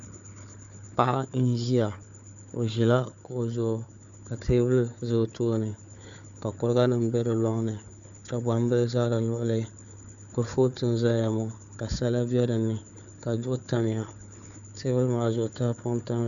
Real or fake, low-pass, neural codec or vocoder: fake; 7.2 kHz; codec, 16 kHz, 4 kbps, FunCodec, trained on Chinese and English, 50 frames a second